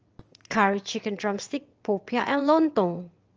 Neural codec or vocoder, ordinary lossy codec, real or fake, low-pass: vocoder, 22.05 kHz, 80 mel bands, WaveNeXt; Opus, 24 kbps; fake; 7.2 kHz